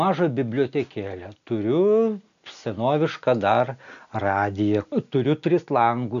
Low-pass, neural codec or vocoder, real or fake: 7.2 kHz; none; real